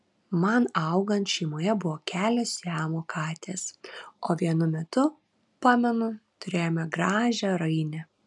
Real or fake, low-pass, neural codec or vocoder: real; 10.8 kHz; none